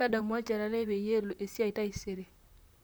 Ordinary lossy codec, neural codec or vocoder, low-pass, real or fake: none; vocoder, 44.1 kHz, 128 mel bands, Pupu-Vocoder; none; fake